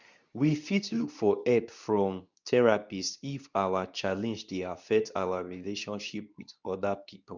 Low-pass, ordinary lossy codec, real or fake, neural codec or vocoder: 7.2 kHz; none; fake; codec, 24 kHz, 0.9 kbps, WavTokenizer, medium speech release version 2